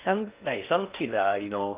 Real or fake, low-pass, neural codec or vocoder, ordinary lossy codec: fake; 3.6 kHz; codec, 16 kHz in and 24 kHz out, 0.6 kbps, FocalCodec, streaming, 2048 codes; Opus, 24 kbps